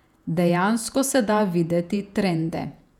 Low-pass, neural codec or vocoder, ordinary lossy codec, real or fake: 19.8 kHz; vocoder, 48 kHz, 128 mel bands, Vocos; none; fake